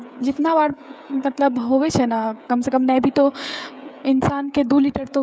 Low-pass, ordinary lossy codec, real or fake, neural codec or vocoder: none; none; fake; codec, 16 kHz, 16 kbps, FreqCodec, smaller model